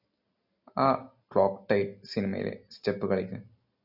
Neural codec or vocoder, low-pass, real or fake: none; 5.4 kHz; real